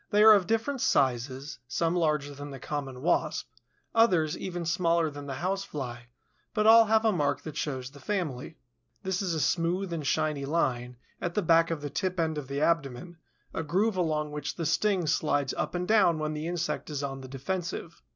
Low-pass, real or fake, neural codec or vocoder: 7.2 kHz; real; none